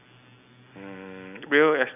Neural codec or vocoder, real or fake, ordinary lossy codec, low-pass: none; real; none; 3.6 kHz